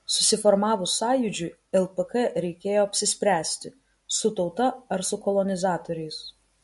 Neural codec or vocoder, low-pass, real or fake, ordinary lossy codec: none; 14.4 kHz; real; MP3, 48 kbps